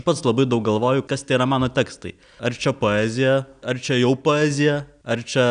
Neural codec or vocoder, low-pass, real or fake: none; 9.9 kHz; real